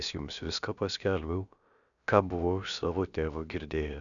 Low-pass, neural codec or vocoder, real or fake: 7.2 kHz; codec, 16 kHz, about 1 kbps, DyCAST, with the encoder's durations; fake